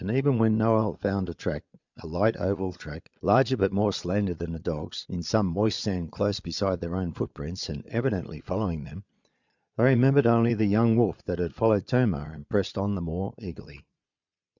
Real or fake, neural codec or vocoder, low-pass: fake; vocoder, 22.05 kHz, 80 mel bands, Vocos; 7.2 kHz